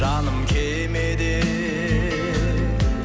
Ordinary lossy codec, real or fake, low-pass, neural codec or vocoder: none; real; none; none